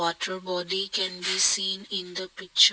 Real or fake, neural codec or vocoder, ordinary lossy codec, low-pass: real; none; none; none